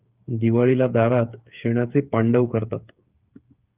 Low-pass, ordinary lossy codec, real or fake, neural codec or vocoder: 3.6 kHz; Opus, 32 kbps; fake; codec, 16 kHz, 8 kbps, FreqCodec, smaller model